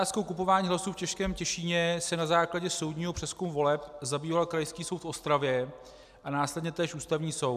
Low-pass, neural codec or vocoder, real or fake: 14.4 kHz; none; real